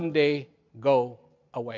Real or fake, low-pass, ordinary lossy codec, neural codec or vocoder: real; 7.2 kHz; MP3, 64 kbps; none